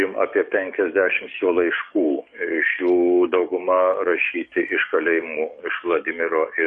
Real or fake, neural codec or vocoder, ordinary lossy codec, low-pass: fake; codec, 44.1 kHz, 7.8 kbps, DAC; MP3, 48 kbps; 10.8 kHz